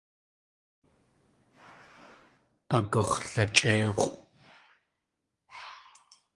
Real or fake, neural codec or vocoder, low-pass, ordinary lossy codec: fake; codec, 24 kHz, 1 kbps, SNAC; 10.8 kHz; Opus, 32 kbps